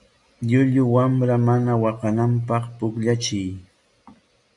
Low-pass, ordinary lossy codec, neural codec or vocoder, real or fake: 10.8 kHz; MP3, 96 kbps; none; real